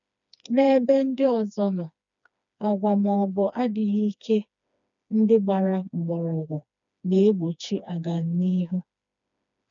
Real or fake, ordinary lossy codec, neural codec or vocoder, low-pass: fake; none; codec, 16 kHz, 2 kbps, FreqCodec, smaller model; 7.2 kHz